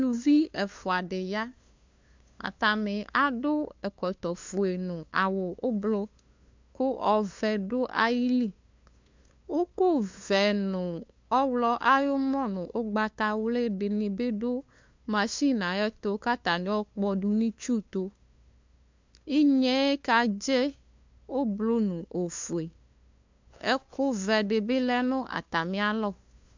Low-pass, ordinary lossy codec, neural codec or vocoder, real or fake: 7.2 kHz; MP3, 64 kbps; codec, 16 kHz, 2 kbps, FunCodec, trained on LibriTTS, 25 frames a second; fake